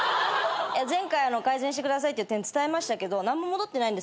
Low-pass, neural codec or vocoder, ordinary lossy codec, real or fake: none; none; none; real